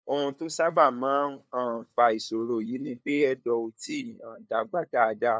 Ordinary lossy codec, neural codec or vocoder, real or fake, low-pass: none; codec, 16 kHz, 2 kbps, FunCodec, trained on LibriTTS, 25 frames a second; fake; none